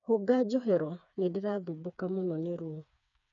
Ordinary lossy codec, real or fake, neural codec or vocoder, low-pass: none; fake; codec, 16 kHz, 4 kbps, FreqCodec, smaller model; 7.2 kHz